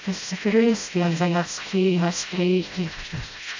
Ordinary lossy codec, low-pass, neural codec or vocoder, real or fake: AAC, 48 kbps; 7.2 kHz; codec, 16 kHz, 0.5 kbps, FreqCodec, smaller model; fake